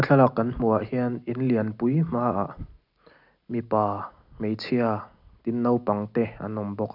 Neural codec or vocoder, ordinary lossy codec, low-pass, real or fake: none; none; 5.4 kHz; real